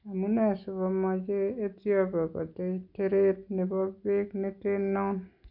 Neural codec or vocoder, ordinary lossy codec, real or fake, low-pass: none; none; real; 5.4 kHz